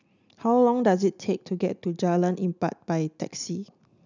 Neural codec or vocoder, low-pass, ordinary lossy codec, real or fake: none; 7.2 kHz; none; real